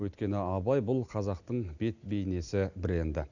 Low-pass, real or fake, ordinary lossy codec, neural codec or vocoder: 7.2 kHz; real; none; none